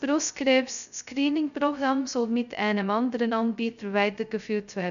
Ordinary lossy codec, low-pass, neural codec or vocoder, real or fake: none; 7.2 kHz; codec, 16 kHz, 0.2 kbps, FocalCodec; fake